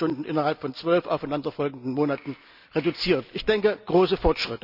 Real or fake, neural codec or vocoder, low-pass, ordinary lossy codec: real; none; 5.4 kHz; none